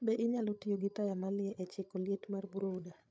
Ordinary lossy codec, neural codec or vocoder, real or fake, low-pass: none; codec, 16 kHz, 16 kbps, FreqCodec, larger model; fake; none